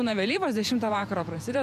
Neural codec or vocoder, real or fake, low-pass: vocoder, 48 kHz, 128 mel bands, Vocos; fake; 14.4 kHz